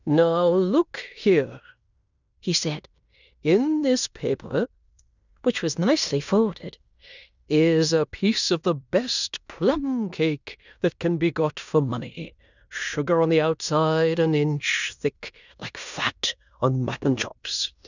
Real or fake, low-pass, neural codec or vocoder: fake; 7.2 kHz; codec, 16 kHz in and 24 kHz out, 0.9 kbps, LongCat-Audio-Codec, fine tuned four codebook decoder